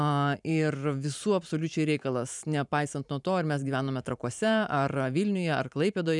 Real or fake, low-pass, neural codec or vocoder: fake; 10.8 kHz; vocoder, 44.1 kHz, 128 mel bands every 512 samples, BigVGAN v2